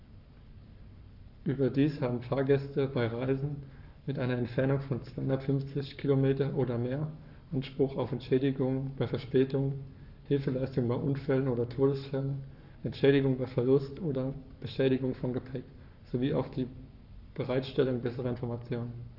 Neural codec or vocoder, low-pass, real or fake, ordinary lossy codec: codec, 44.1 kHz, 7.8 kbps, Pupu-Codec; 5.4 kHz; fake; none